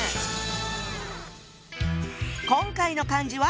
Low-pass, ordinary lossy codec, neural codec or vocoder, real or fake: none; none; none; real